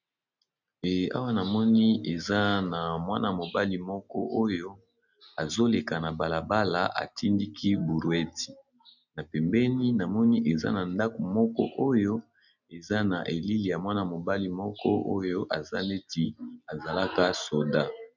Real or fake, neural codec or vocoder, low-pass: real; none; 7.2 kHz